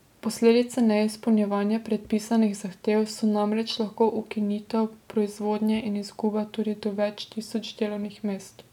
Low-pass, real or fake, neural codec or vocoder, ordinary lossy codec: 19.8 kHz; real; none; none